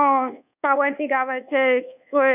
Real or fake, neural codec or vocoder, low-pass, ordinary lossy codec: fake; codec, 24 kHz, 1.2 kbps, DualCodec; 3.6 kHz; none